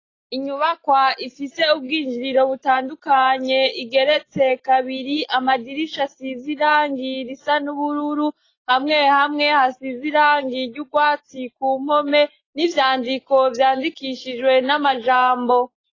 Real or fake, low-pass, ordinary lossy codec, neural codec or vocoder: real; 7.2 kHz; AAC, 32 kbps; none